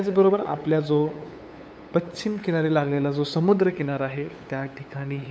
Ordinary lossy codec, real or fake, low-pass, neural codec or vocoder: none; fake; none; codec, 16 kHz, 8 kbps, FunCodec, trained on LibriTTS, 25 frames a second